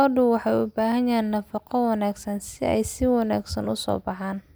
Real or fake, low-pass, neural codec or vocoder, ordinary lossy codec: real; none; none; none